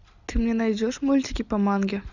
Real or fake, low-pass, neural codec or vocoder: real; 7.2 kHz; none